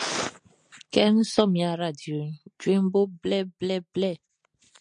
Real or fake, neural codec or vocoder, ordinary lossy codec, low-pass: real; none; MP3, 64 kbps; 9.9 kHz